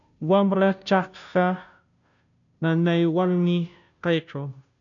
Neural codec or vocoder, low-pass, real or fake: codec, 16 kHz, 0.5 kbps, FunCodec, trained on Chinese and English, 25 frames a second; 7.2 kHz; fake